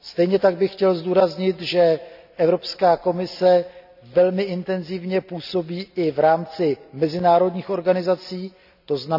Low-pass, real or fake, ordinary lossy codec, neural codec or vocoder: 5.4 kHz; real; none; none